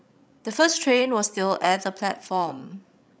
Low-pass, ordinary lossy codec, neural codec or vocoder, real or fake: none; none; codec, 16 kHz, 16 kbps, FreqCodec, larger model; fake